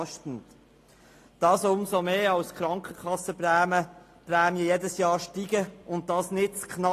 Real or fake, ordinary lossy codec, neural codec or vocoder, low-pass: real; AAC, 48 kbps; none; 14.4 kHz